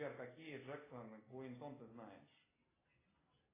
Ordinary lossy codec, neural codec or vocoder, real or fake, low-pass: AAC, 16 kbps; none; real; 3.6 kHz